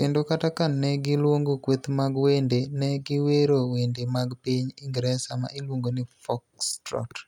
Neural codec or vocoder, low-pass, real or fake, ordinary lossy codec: none; 19.8 kHz; real; none